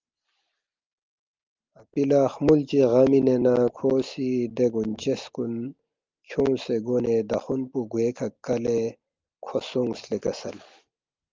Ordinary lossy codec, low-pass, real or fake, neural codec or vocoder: Opus, 32 kbps; 7.2 kHz; real; none